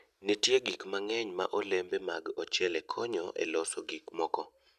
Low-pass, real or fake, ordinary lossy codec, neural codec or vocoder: 14.4 kHz; real; none; none